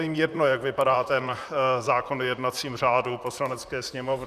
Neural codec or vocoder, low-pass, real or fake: vocoder, 44.1 kHz, 128 mel bands, Pupu-Vocoder; 14.4 kHz; fake